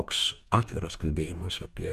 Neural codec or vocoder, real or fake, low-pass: codec, 44.1 kHz, 2.6 kbps, DAC; fake; 14.4 kHz